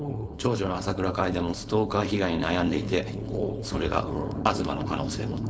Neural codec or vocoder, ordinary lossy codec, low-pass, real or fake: codec, 16 kHz, 4.8 kbps, FACodec; none; none; fake